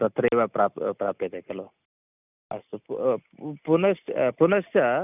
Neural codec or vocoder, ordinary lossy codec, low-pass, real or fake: none; none; 3.6 kHz; real